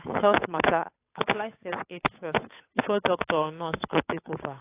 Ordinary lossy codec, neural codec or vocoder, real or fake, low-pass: AAC, 24 kbps; codec, 16 kHz, 8 kbps, FunCodec, trained on Chinese and English, 25 frames a second; fake; 3.6 kHz